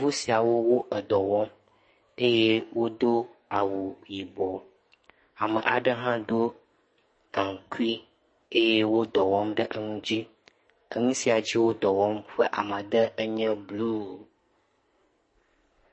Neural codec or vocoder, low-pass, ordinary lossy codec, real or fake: codec, 44.1 kHz, 2.6 kbps, SNAC; 9.9 kHz; MP3, 32 kbps; fake